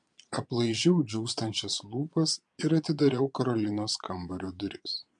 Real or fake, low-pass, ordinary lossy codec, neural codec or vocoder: fake; 9.9 kHz; MP3, 64 kbps; vocoder, 22.05 kHz, 80 mel bands, Vocos